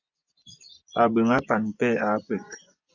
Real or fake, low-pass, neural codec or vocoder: fake; 7.2 kHz; vocoder, 24 kHz, 100 mel bands, Vocos